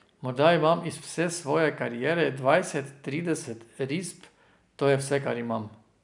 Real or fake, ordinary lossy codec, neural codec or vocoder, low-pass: real; none; none; 10.8 kHz